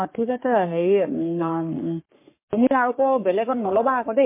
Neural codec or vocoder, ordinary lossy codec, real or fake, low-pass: codec, 44.1 kHz, 3.4 kbps, Pupu-Codec; MP3, 24 kbps; fake; 3.6 kHz